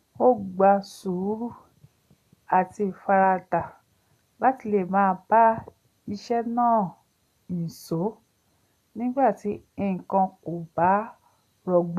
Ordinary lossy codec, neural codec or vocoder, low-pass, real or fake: none; none; 14.4 kHz; real